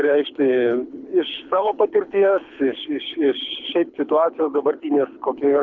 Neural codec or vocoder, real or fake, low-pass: codec, 24 kHz, 6 kbps, HILCodec; fake; 7.2 kHz